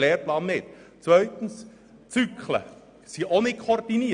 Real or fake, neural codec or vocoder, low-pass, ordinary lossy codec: real; none; 9.9 kHz; none